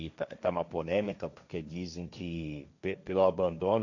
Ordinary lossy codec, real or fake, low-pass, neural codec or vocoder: none; fake; 7.2 kHz; codec, 16 kHz, 1.1 kbps, Voila-Tokenizer